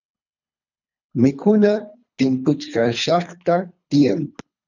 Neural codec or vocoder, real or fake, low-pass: codec, 24 kHz, 3 kbps, HILCodec; fake; 7.2 kHz